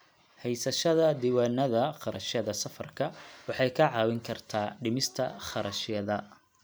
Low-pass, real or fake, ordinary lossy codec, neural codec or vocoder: none; real; none; none